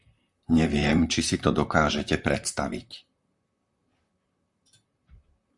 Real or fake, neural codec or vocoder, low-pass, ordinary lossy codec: fake; vocoder, 44.1 kHz, 128 mel bands, Pupu-Vocoder; 10.8 kHz; Opus, 64 kbps